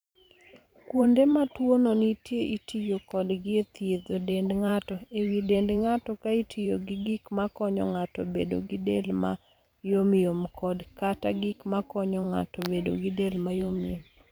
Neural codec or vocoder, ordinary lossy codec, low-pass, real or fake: vocoder, 44.1 kHz, 128 mel bands every 512 samples, BigVGAN v2; none; none; fake